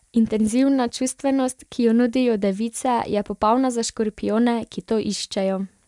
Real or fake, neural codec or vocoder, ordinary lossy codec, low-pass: real; none; MP3, 96 kbps; 10.8 kHz